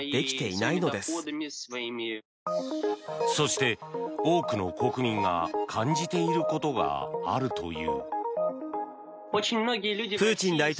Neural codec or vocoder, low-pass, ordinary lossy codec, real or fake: none; none; none; real